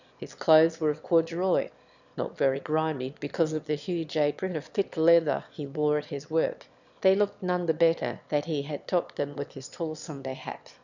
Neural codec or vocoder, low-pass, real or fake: autoencoder, 22.05 kHz, a latent of 192 numbers a frame, VITS, trained on one speaker; 7.2 kHz; fake